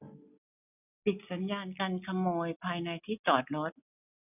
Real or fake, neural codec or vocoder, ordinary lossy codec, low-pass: real; none; none; 3.6 kHz